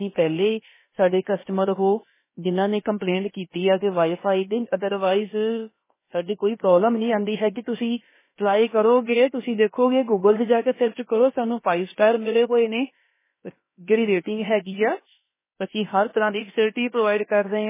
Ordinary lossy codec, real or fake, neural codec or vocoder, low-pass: MP3, 16 kbps; fake; codec, 16 kHz, about 1 kbps, DyCAST, with the encoder's durations; 3.6 kHz